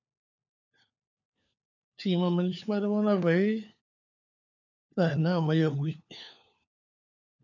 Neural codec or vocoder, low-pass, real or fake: codec, 16 kHz, 4 kbps, FunCodec, trained on LibriTTS, 50 frames a second; 7.2 kHz; fake